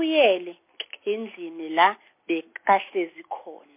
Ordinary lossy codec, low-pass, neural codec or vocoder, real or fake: MP3, 24 kbps; 3.6 kHz; none; real